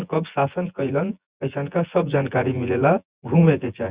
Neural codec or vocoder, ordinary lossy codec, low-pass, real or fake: vocoder, 24 kHz, 100 mel bands, Vocos; Opus, 64 kbps; 3.6 kHz; fake